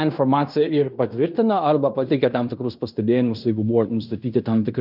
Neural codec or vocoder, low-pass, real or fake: codec, 16 kHz in and 24 kHz out, 0.9 kbps, LongCat-Audio-Codec, fine tuned four codebook decoder; 5.4 kHz; fake